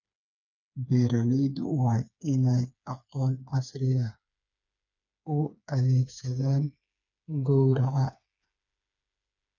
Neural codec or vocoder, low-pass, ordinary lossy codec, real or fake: codec, 16 kHz, 4 kbps, FreqCodec, smaller model; 7.2 kHz; none; fake